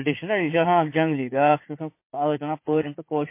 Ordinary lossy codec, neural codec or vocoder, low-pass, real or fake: MP3, 24 kbps; vocoder, 44.1 kHz, 80 mel bands, Vocos; 3.6 kHz; fake